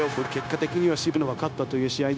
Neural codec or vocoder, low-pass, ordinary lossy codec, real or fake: codec, 16 kHz, 0.9 kbps, LongCat-Audio-Codec; none; none; fake